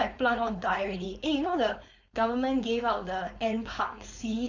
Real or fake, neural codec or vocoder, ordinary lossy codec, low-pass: fake; codec, 16 kHz, 4.8 kbps, FACodec; Opus, 64 kbps; 7.2 kHz